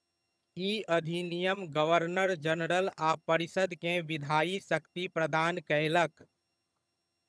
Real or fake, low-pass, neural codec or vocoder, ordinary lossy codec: fake; none; vocoder, 22.05 kHz, 80 mel bands, HiFi-GAN; none